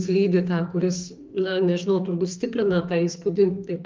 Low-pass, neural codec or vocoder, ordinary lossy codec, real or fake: 7.2 kHz; codec, 44.1 kHz, 2.6 kbps, SNAC; Opus, 24 kbps; fake